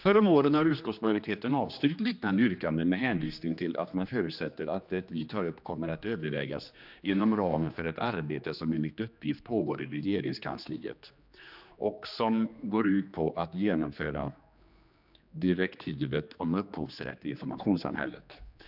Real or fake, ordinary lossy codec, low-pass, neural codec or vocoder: fake; none; 5.4 kHz; codec, 16 kHz, 2 kbps, X-Codec, HuBERT features, trained on general audio